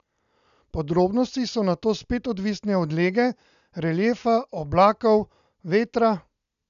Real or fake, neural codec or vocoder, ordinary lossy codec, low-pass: real; none; none; 7.2 kHz